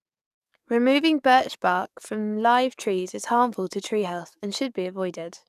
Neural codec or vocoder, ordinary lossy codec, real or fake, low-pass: codec, 44.1 kHz, 7.8 kbps, DAC; none; fake; 14.4 kHz